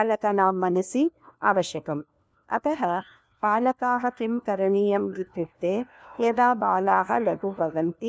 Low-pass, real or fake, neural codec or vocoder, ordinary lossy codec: none; fake; codec, 16 kHz, 1 kbps, FunCodec, trained on LibriTTS, 50 frames a second; none